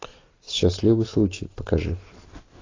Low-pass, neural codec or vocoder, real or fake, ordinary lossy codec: 7.2 kHz; none; real; AAC, 32 kbps